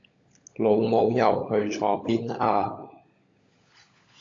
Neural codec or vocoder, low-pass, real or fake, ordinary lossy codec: codec, 16 kHz, 16 kbps, FunCodec, trained on LibriTTS, 50 frames a second; 7.2 kHz; fake; AAC, 48 kbps